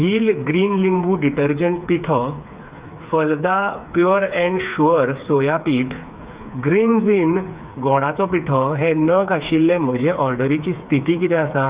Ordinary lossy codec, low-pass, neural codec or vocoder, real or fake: Opus, 64 kbps; 3.6 kHz; codec, 16 kHz, 4 kbps, FreqCodec, smaller model; fake